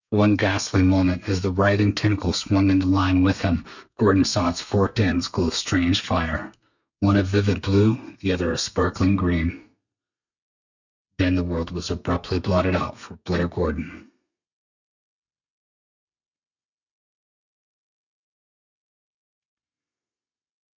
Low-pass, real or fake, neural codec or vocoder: 7.2 kHz; fake; codec, 32 kHz, 1.9 kbps, SNAC